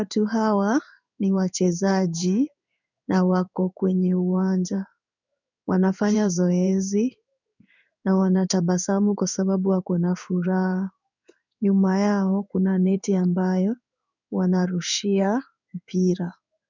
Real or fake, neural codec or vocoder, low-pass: fake; codec, 16 kHz in and 24 kHz out, 1 kbps, XY-Tokenizer; 7.2 kHz